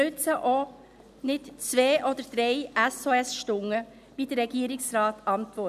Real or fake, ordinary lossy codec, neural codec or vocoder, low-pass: real; none; none; 14.4 kHz